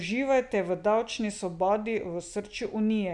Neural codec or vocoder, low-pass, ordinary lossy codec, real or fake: none; 10.8 kHz; none; real